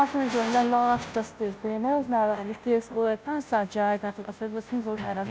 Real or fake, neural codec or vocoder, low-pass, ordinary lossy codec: fake; codec, 16 kHz, 0.5 kbps, FunCodec, trained on Chinese and English, 25 frames a second; none; none